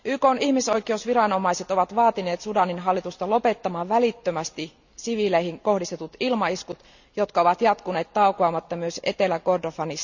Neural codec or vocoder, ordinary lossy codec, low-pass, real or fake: none; none; 7.2 kHz; real